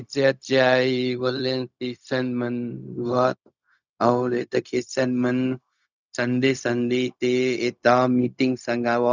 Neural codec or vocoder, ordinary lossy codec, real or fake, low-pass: codec, 16 kHz, 0.4 kbps, LongCat-Audio-Codec; none; fake; 7.2 kHz